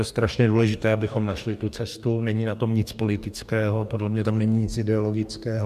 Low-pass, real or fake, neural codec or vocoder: 14.4 kHz; fake; codec, 44.1 kHz, 2.6 kbps, DAC